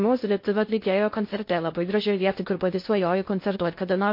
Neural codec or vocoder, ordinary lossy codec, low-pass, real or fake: codec, 16 kHz in and 24 kHz out, 0.6 kbps, FocalCodec, streaming, 2048 codes; MP3, 32 kbps; 5.4 kHz; fake